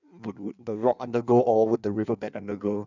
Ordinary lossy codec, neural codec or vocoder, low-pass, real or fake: none; codec, 16 kHz in and 24 kHz out, 1.1 kbps, FireRedTTS-2 codec; 7.2 kHz; fake